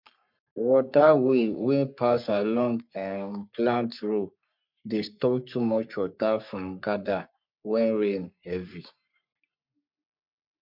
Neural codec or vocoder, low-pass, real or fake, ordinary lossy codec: codec, 44.1 kHz, 3.4 kbps, Pupu-Codec; 5.4 kHz; fake; MP3, 48 kbps